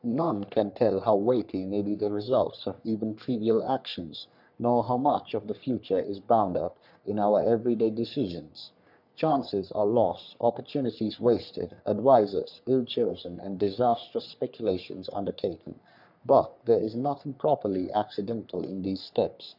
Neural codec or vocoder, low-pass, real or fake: codec, 44.1 kHz, 3.4 kbps, Pupu-Codec; 5.4 kHz; fake